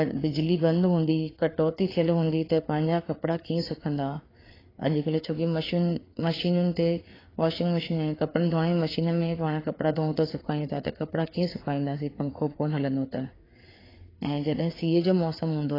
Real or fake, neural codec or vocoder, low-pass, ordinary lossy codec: fake; codec, 16 kHz, 4 kbps, FreqCodec, larger model; 5.4 kHz; AAC, 24 kbps